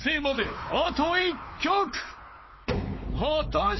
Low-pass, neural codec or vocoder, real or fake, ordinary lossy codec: 7.2 kHz; codec, 16 kHz, 4 kbps, X-Codec, WavLM features, trained on Multilingual LibriSpeech; fake; MP3, 24 kbps